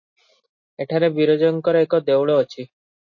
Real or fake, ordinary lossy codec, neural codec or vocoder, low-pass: real; MP3, 32 kbps; none; 7.2 kHz